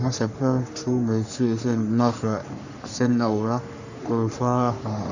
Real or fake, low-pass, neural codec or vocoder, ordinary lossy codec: fake; 7.2 kHz; codec, 44.1 kHz, 3.4 kbps, Pupu-Codec; none